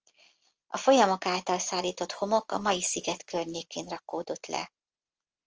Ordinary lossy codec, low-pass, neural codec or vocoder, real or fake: Opus, 24 kbps; 7.2 kHz; none; real